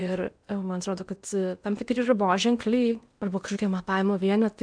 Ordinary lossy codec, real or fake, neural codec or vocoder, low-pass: MP3, 96 kbps; fake; codec, 16 kHz in and 24 kHz out, 0.8 kbps, FocalCodec, streaming, 65536 codes; 9.9 kHz